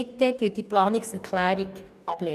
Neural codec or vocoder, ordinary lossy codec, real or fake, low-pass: codec, 44.1 kHz, 2.6 kbps, DAC; none; fake; 14.4 kHz